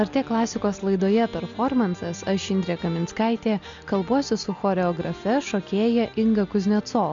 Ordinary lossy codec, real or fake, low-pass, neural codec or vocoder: MP3, 64 kbps; real; 7.2 kHz; none